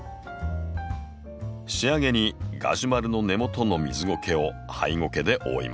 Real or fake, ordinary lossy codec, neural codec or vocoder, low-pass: real; none; none; none